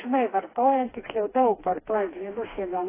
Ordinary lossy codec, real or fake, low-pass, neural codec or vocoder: AAC, 16 kbps; fake; 3.6 kHz; codec, 16 kHz, 2 kbps, FreqCodec, smaller model